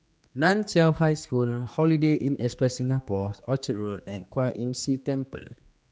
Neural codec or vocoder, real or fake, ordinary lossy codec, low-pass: codec, 16 kHz, 2 kbps, X-Codec, HuBERT features, trained on general audio; fake; none; none